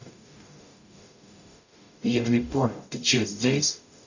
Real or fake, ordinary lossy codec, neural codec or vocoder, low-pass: fake; none; codec, 44.1 kHz, 0.9 kbps, DAC; 7.2 kHz